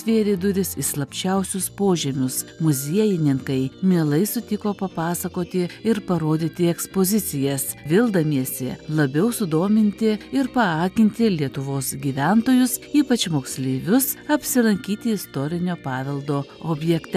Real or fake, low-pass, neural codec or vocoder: real; 14.4 kHz; none